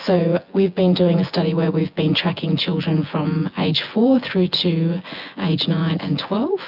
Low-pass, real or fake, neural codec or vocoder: 5.4 kHz; fake; vocoder, 24 kHz, 100 mel bands, Vocos